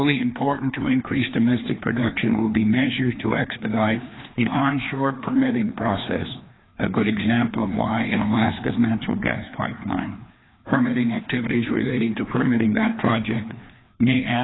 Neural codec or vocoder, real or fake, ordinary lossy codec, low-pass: codec, 16 kHz, 2 kbps, FreqCodec, larger model; fake; AAC, 16 kbps; 7.2 kHz